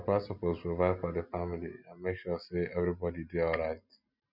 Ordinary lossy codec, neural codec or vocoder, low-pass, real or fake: none; none; 5.4 kHz; real